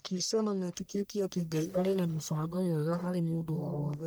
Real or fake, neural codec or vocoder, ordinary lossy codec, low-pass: fake; codec, 44.1 kHz, 1.7 kbps, Pupu-Codec; none; none